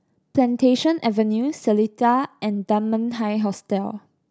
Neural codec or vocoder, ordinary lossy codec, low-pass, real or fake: none; none; none; real